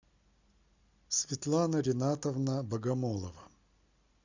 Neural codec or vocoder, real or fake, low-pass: none; real; 7.2 kHz